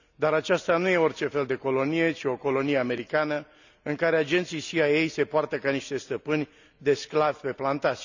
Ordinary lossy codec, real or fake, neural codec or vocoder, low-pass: none; real; none; 7.2 kHz